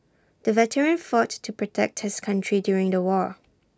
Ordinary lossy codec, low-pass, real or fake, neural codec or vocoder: none; none; real; none